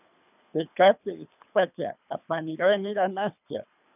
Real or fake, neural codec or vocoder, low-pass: fake; codec, 24 kHz, 3 kbps, HILCodec; 3.6 kHz